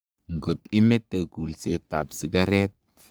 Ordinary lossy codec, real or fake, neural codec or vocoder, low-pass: none; fake; codec, 44.1 kHz, 3.4 kbps, Pupu-Codec; none